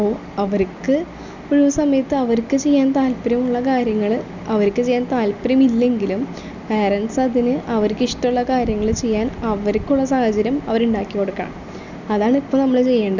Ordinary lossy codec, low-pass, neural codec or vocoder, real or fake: none; 7.2 kHz; none; real